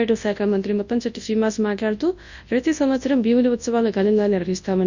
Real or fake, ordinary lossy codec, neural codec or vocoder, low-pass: fake; Opus, 64 kbps; codec, 24 kHz, 0.9 kbps, WavTokenizer, large speech release; 7.2 kHz